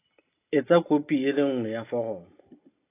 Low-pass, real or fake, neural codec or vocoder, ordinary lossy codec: 3.6 kHz; real; none; AAC, 24 kbps